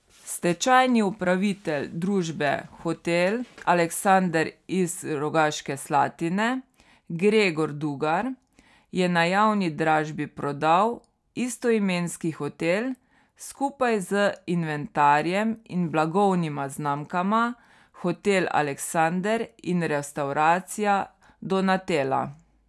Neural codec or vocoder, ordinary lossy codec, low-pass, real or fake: none; none; none; real